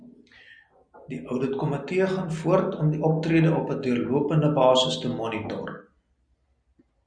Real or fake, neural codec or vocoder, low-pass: real; none; 9.9 kHz